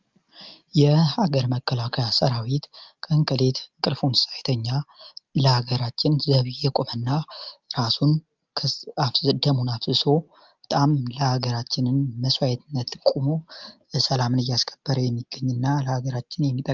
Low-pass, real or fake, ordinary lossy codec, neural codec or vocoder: 7.2 kHz; real; Opus, 32 kbps; none